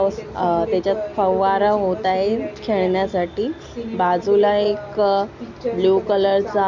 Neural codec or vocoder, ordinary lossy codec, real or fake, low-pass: none; none; real; 7.2 kHz